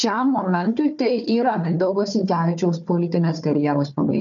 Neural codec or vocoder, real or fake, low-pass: codec, 16 kHz, 4 kbps, FunCodec, trained on Chinese and English, 50 frames a second; fake; 7.2 kHz